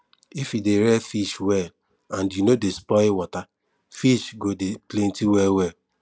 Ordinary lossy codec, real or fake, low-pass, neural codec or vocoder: none; real; none; none